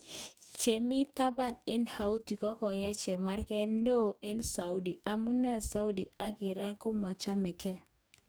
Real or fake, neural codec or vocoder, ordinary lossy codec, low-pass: fake; codec, 44.1 kHz, 2.6 kbps, DAC; none; none